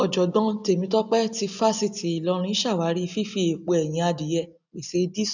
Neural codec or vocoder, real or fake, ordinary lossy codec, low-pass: none; real; none; 7.2 kHz